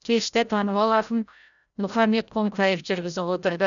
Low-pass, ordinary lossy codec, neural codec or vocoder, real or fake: 7.2 kHz; none; codec, 16 kHz, 0.5 kbps, FreqCodec, larger model; fake